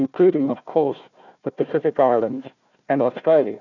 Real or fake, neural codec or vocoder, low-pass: fake; codec, 16 kHz, 1 kbps, FunCodec, trained on Chinese and English, 50 frames a second; 7.2 kHz